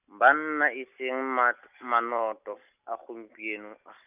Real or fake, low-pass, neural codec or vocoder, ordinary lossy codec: real; 3.6 kHz; none; none